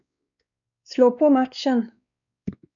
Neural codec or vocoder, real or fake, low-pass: codec, 16 kHz, 2 kbps, X-Codec, WavLM features, trained on Multilingual LibriSpeech; fake; 7.2 kHz